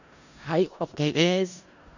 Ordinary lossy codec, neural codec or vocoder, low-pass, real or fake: none; codec, 16 kHz in and 24 kHz out, 0.4 kbps, LongCat-Audio-Codec, four codebook decoder; 7.2 kHz; fake